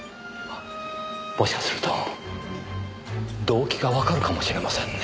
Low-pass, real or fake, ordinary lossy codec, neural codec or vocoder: none; real; none; none